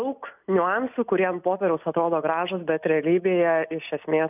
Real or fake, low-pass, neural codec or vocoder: real; 3.6 kHz; none